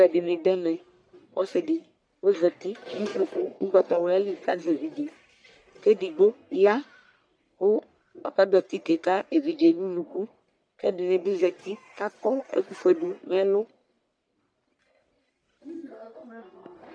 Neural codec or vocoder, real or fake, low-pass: codec, 44.1 kHz, 1.7 kbps, Pupu-Codec; fake; 9.9 kHz